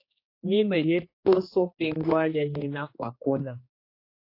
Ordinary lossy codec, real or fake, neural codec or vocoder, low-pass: AAC, 32 kbps; fake; codec, 16 kHz, 2 kbps, X-Codec, HuBERT features, trained on general audio; 5.4 kHz